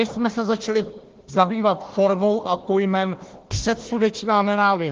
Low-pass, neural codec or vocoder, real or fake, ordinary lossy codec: 7.2 kHz; codec, 16 kHz, 1 kbps, FunCodec, trained on Chinese and English, 50 frames a second; fake; Opus, 16 kbps